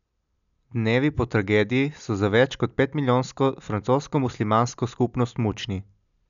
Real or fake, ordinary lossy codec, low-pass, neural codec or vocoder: real; none; 7.2 kHz; none